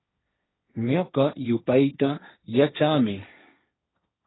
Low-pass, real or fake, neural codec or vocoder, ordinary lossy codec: 7.2 kHz; fake; codec, 16 kHz, 1.1 kbps, Voila-Tokenizer; AAC, 16 kbps